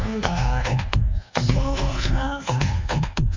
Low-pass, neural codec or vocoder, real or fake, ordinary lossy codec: 7.2 kHz; codec, 24 kHz, 1.2 kbps, DualCodec; fake; MP3, 64 kbps